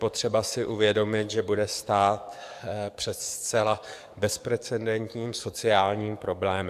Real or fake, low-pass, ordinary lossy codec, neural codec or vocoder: fake; 14.4 kHz; AAC, 96 kbps; codec, 44.1 kHz, 7.8 kbps, DAC